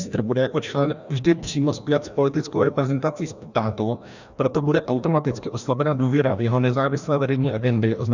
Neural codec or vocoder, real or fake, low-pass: codec, 16 kHz, 1 kbps, FreqCodec, larger model; fake; 7.2 kHz